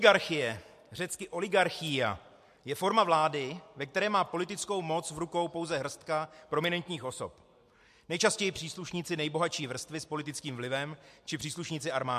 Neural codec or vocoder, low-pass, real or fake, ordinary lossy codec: none; 14.4 kHz; real; MP3, 64 kbps